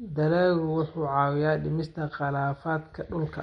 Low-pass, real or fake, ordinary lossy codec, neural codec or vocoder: 9.9 kHz; real; MP3, 48 kbps; none